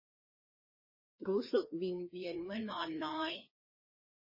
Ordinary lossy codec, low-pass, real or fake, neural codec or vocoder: MP3, 24 kbps; 5.4 kHz; fake; codec, 16 kHz, 2 kbps, FreqCodec, larger model